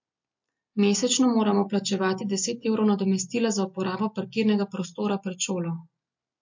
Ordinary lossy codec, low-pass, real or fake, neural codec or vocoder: MP3, 48 kbps; 7.2 kHz; real; none